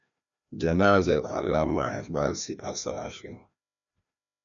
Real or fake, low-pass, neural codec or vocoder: fake; 7.2 kHz; codec, 16 kHz, 1 kbps, FreqCodec, larger model